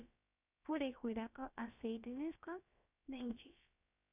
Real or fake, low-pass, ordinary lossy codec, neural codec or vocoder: fake; 3.6 kHz; AAC, 24 kbps; codec, 16 kHz, about 1 kbps, DyCAST, with the encoder's durations